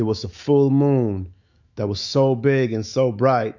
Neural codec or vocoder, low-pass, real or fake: autoencoder, 48 kHz, 128 numbers a frame, DAC-VAE, trained on Japanese speech; 7.2 kHz; fake